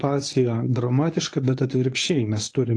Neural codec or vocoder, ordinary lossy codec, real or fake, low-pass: codec, 24 kHz, 0.9 kbps, WavTokenizer, medium speech release version 1; AAC, 48 kbps; fake; 9.9 kHz